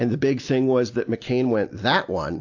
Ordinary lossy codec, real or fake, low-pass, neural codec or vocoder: AAC, 48 kbps; real; 7.2 kHz; none